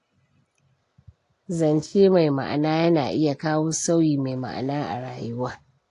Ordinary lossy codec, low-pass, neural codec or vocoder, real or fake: AAC, 48 kbps; 10.8 kHz; none; real